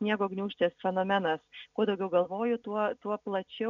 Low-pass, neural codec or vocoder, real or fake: 7.2 kHz; none; real